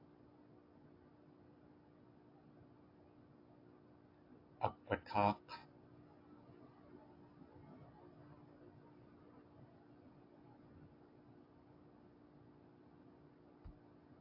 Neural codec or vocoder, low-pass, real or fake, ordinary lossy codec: none; 5.4 kHz; real; AAC, 32 kbps